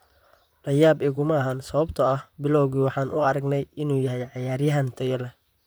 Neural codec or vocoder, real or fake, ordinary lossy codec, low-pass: vocoder, 44.1 kHz, 128 mel bands, Pupu-Vocoder; fake; none; none